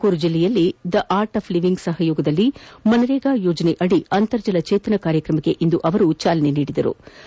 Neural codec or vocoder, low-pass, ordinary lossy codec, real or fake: none; none; none; real